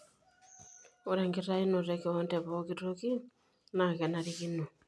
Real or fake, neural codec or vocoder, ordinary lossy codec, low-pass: real; none; none; none